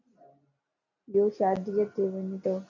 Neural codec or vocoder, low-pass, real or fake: none; 7.2 kHz; real